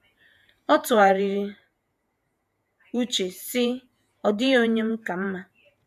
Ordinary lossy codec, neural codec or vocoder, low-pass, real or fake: none; vocoder, 48 kHz, 128 mel bands, Vocos; 14.4 kHz; fake